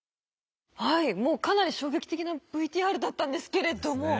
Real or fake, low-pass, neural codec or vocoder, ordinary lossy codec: real; none; none; none